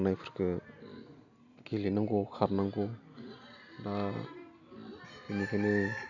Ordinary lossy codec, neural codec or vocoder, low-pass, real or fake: none; none; 7.2 kHz; real